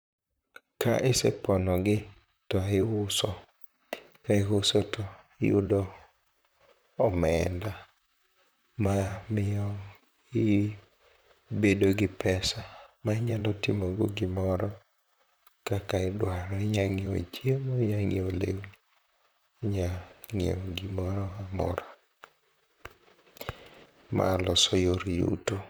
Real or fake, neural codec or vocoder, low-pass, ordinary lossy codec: fake; vocoder, 44.1 kHz, 128 mel bands, Pupu-Vocoder; none; none